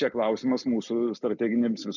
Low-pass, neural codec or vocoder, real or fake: 7.2 kHz; none; real